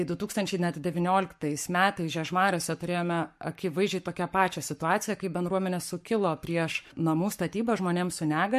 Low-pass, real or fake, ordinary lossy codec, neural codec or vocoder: 14.4 kHz; fake; MP3, 64 kbps; codec, 44.1 kHz, 7.8 kbps, Pupu-Codec